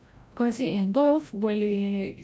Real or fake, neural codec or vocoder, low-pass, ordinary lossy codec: fake; codec, 16 kHz, 0.5 kbps, FreqCodec, larger model; none; none